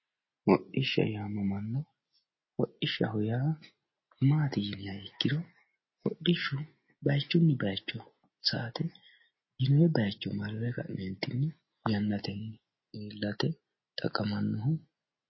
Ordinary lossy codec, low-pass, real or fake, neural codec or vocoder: MP3, 24 kbps; 7.2 kHz; real; none